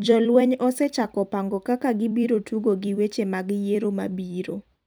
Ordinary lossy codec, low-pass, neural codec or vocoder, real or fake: none; none; vocoder, 44.1 kHz, 128 mel bands every 256 samples, BigVGAN v2; fake